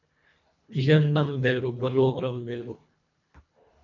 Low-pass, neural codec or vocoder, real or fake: 7.2 kHz; codec, 24 kHz, 1.5 kbps, HILCodec; fake